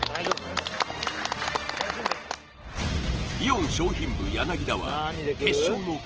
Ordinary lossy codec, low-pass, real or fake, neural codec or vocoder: Opus, 16 kbps; 7.2 kHz; real; none